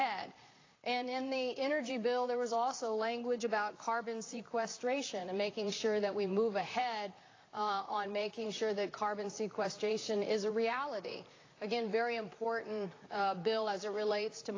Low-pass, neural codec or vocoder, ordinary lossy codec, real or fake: 7.2 kHz; vocoder, 44.1 kHz, 128 mel bands, Pupu-Vocoder; AAC, 32 kbps; fake